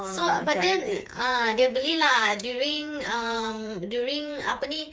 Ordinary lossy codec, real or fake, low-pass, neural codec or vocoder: none; fake; none; codec, 16 kHz, 4 kbps, FreqCodec, smaller model